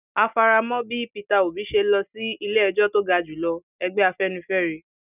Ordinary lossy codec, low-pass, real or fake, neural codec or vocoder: none; 3.6 kHz; real; none